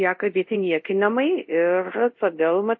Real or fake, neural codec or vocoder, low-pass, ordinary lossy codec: fake; codec, 24 kHz, 0.5 kbps, DualCodec; 7.2 kHz; MP3, 32 kbps